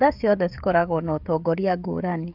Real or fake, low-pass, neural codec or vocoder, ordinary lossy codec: fake; 5.4 kHz; codec, 16 kHz, 16 kbps, FreqCodec, smaller model; none